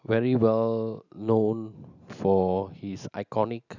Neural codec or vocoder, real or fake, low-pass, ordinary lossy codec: none; real; 7.2 kHz; none